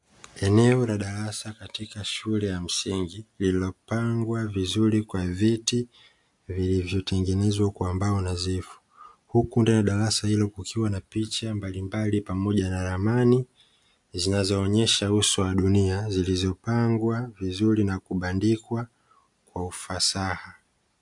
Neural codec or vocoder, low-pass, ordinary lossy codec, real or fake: none; 10.8 kHz; MP3, 64 kbps; real